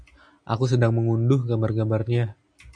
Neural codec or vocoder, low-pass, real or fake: none; 9.9 kHz; real